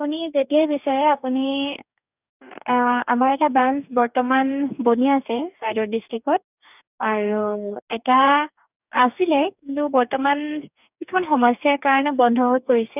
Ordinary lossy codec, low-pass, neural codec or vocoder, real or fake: none; 3.6 kHz; codec, 44.1 kHz, 2.6 kbps, DAC; fake